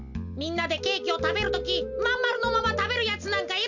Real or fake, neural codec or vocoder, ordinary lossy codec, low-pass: real; none; none; 7.2 kHz